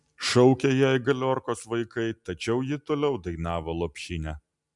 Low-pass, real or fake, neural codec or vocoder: 10.8 kHz; real; none